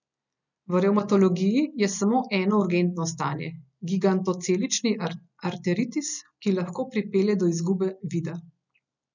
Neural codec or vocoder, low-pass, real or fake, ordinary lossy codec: none; 7.2 kHz; real; none